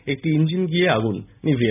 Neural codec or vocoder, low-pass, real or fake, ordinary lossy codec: none; 3.6 kHz; real; none